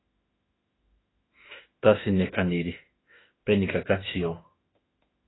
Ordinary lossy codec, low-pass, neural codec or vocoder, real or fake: AAC, 16 kbps; 7.2 kHz; autoencoder, 48 kHz, 32 numbers a frame, DAC-VAE, trained on Japanese speech; fake